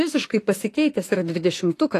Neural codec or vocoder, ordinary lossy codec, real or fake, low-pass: autoencoder, 48 kHz, 32 numbers a frame, DAC-VAE, trained on Japanese speech; AAC, 48 kbps; fake; 14.4 kHz